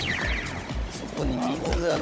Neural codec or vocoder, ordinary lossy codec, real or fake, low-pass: codec, 16 kHz, 16 kbps, FunCodec, trained on Chinese and English, 50 frames a second; none; fake; none